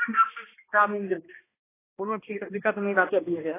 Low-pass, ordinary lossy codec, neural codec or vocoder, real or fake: 3.6 kHz; AAC, 16 kbps; codec, 16 kHz, 1 kbps, X-Codec, HuBERT features, trained on balanced general audio; fake